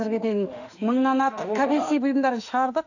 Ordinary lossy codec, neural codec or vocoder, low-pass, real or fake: none; autoencoder, 48 kHz, 32 numbers a frame, DAC-VAE, trained on Japanese speech; 7.2 kHz; fake